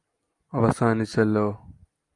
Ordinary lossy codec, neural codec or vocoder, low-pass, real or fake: Opus, 32 kbps; none; 10.8 kHz; real